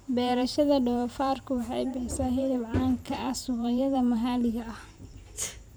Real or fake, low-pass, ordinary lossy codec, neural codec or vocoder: fake; none; none; vocoder, 44.1 kHz, 128 mel bands every 512 samples, BigVGAN v2